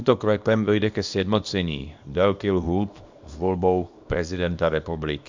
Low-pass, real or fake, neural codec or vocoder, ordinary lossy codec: 7.2 kHz; fake; codec, 24 kHz, 0.9 kbps, WavTokenizer, small release; MP3, 64 kbps